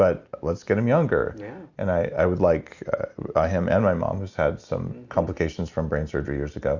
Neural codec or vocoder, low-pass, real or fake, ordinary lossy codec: none; 7.2 kHz; real; Opus, 64 kbps